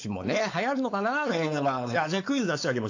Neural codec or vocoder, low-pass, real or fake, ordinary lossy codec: codec, 16 kHz, 4.8 kbps, FACodec; 7.2 kHz; fake; AAC, 48 kbps